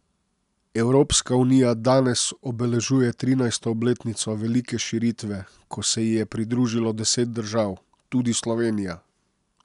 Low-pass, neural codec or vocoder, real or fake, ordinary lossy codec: 10.8 kHz; none; real; none